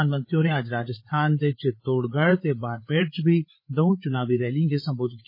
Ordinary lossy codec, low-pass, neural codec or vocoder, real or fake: MP3, 24 kbps; 5.4 kHz; codec, 16 kHz, 8 kbps, FreqCodec, larger model; fake